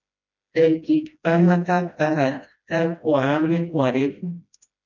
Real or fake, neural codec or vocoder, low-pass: fake; codec, 16 kHz, 1 kbps, FreqCodec, smaller model; 7.2 kHz